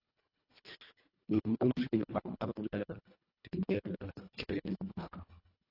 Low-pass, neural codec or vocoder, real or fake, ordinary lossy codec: 5.4 kHz; codec, 24 kHz, 1.5 kbps, HILCodec; fake; AAC, 32 kbps